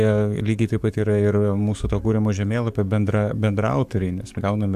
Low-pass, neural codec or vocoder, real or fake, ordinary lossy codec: 14.4 kHz; codec, 44.1 kHz, 7.8 kbps, DAC; fake; AAC, 96 kbps